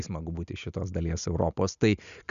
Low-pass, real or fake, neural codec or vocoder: 7.2 kHz; real; none